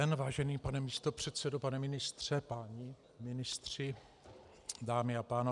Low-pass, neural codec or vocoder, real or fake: 10.8 kHz; none; real